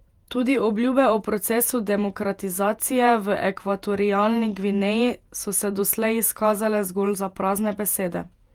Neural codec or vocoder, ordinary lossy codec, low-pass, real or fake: vocoder, 48 kHz, 128 mel bands, Vocos; Opus, 32 kbps; 19.8 kHz; fake